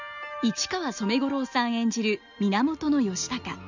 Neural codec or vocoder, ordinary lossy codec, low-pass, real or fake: none; none; 7.2 kHz; real